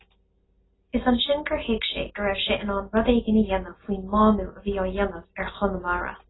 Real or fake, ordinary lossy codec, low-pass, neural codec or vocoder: real; AAC, 16 kbps; 7.2 kHz; none